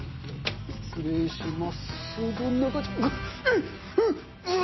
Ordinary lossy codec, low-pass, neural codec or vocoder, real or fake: MP3, 24 kbps; 7.2 kHz; none; real